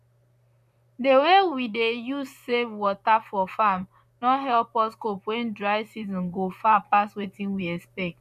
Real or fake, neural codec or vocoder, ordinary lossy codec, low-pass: fake; vocoder, 44.1 kHz, 128 mel bands, Pupu-Vocoder; none; 14.4 kHz